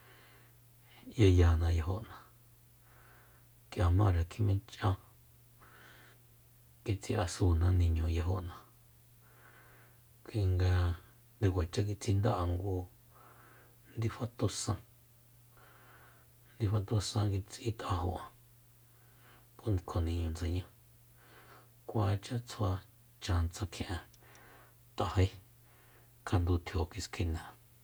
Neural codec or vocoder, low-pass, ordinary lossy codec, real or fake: none; none; none; real